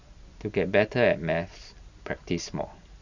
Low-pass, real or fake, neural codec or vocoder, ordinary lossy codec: 7.2 kHz; real; none; Opus, 64 kbps